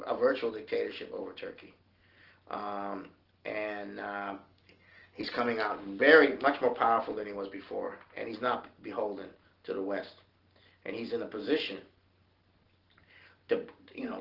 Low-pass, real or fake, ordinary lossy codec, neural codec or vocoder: 5.4 kHz; real; Opus, 16 kbps; none